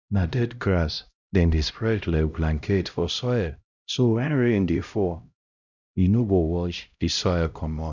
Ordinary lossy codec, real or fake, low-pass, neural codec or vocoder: none; fake; 7.2 kHz; codec, 16 kHz, 0.5 kbps, X-Codec, HuBERT features, trained on LibriSpeech